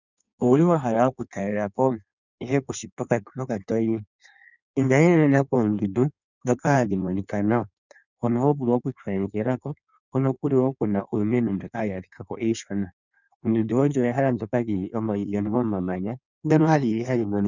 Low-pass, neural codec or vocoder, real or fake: 7.2 kHz; codec, 16 kHz in and 24 kHz out, 1.1 kbps, FireRedTTS-2 codec; fake